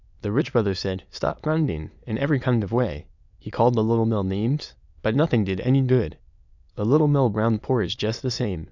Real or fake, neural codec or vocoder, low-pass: fake; autoencoder, 22.05 kHz, a latent of 192 numbers a frame, VITS, trained on many speakers; 7.2 kHz